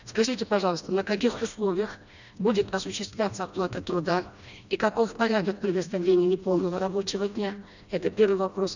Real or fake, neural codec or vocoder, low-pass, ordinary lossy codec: fake; codec, 16 kHz, 1 kbps, FreqCodec, smaller model; 7.2 kHz; none